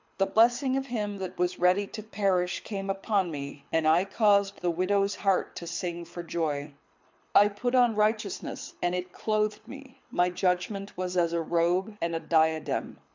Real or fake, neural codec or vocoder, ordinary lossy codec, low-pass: fake; codec, 24 kHz, 6 kbps, HILCodec; MP3, 64 kbps; 7.2 kHz